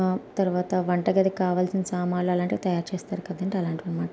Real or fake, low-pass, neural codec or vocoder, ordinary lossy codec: real; none; none; none